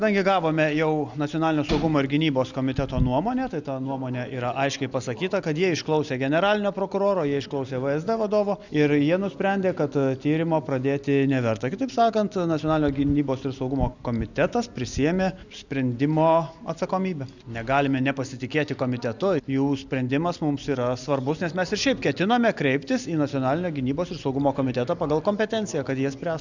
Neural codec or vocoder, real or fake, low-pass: none; real; 7.2 kHz